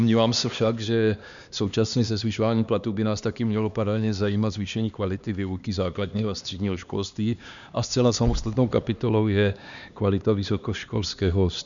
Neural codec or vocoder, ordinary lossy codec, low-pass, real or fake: codec, 16 kHz, 2 kbps, X-Codec, HuBERT features, trained on LibriSpeech; MP3, 96 kbps; 7.2 kHz; fake